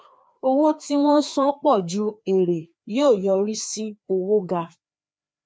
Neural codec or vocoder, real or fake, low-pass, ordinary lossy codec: codec, 16 kHz, 2 kbps, FreqCodec, larger model; fake; none; none